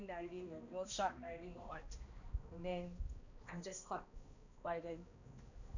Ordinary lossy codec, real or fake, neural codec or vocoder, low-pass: none; fake; codec, 16 kHz, 1 kbps, X-Codec, HuBERT features, trained on balanced general audio; 7.2 kHz